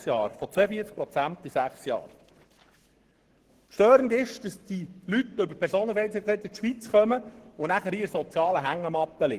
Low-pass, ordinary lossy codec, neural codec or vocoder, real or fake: 14.4 kHz; Opus, 16 kbps; codec, 44.1 kHz, 7.8 kbps, Pupu-Codec; fake